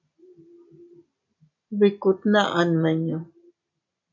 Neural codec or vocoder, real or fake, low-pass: none; real; 7.2 kHz